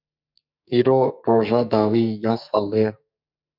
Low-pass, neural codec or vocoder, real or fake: 5.4 kHz; codec, 44.1 kHz, 2.6 kbps, SNAC; fake